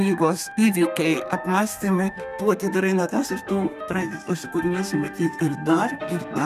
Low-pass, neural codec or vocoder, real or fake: 14.4 kHz; codec, 32 kHz, 1.9 kbps, SNAC; fake